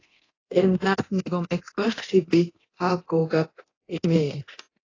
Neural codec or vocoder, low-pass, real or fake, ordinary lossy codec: codec, 24 kHz, 0.9 kbps, DualCodec; 7.2 kHz; fake; AAC, 32 kbps